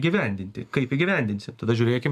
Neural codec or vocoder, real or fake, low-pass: none; real; 14.4 kHz